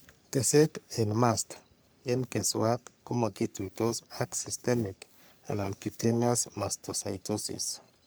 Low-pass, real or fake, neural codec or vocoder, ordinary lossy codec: none; fake; codec, 44.1 kHz, 3.4 kbps, Pupu-Codec; none